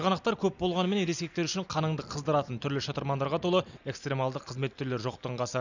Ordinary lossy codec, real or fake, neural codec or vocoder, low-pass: none; real; none; 7.2 kHz